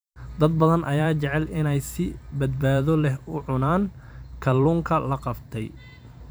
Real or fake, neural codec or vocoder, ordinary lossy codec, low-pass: fake; vocoder, 44.1 kHz, 128 mel bands every 512 samples, BigVGAN v2; none; none